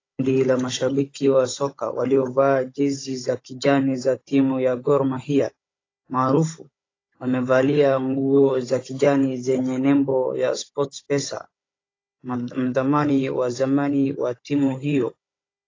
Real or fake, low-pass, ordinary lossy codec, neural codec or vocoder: fake; 7.2 kHz; AAC, 32 kbps; codec, 16 kHz, 16 kbps, FunCodec, trained on Chinese and English, 50 frames a second